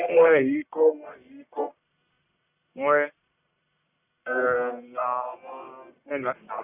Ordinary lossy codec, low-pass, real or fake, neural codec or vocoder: none; 3.6 kHz; fake; codec, 44.1 kHz, 1.7 kbps, Pupu-Codec